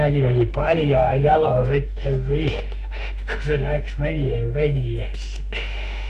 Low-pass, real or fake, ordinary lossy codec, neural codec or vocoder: 14.4 kHz; fake; none; autoencoder, 48 kHz, 32 numbers a frame, DAC-VAE, trained on Japanese speech